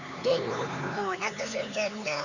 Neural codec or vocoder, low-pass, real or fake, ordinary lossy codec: codec, 16 kHz, 4 kbps, X-Codec, HuBERT features, trained on LibriSpeech; 7.2 kHz; fake; none